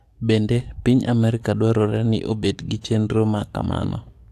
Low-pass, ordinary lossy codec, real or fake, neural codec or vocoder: 14.4 kHz; AAC, 64 kbps; fake; codec, 44.1 kHz, 7.8 kbps, DAC